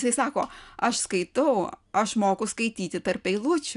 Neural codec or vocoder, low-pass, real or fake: none; 10.8 kHz; real